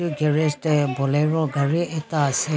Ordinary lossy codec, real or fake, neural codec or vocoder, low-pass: none; real; none; none